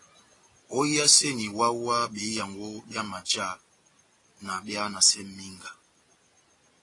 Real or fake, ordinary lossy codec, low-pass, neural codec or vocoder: real; AAC, 32 kbps; 10.8 kHz; none